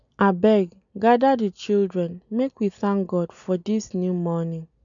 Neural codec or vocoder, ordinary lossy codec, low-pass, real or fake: none; none; 7.2 kHz; real